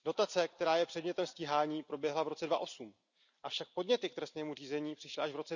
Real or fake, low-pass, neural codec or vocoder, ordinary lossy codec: real; 7.2 kHz; none; none